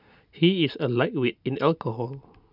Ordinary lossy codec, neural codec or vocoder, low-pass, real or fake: none; none; 5.4 kHz; real